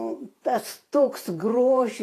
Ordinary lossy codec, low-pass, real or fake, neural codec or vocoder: AAC, 48 kbps; 14.4 kHz; fake; vocoder, 48 kHz, 128 mel bands, Vocos